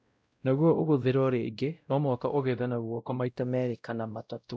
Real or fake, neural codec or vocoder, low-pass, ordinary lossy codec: fake; codec, 16 kHz, 0.5 kbps, X-Codec, WavLM features, trained on Multilingual LibriSpeech; none; none